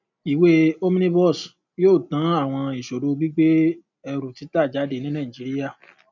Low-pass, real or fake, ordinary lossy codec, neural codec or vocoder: 7.2 kHz; real; none; none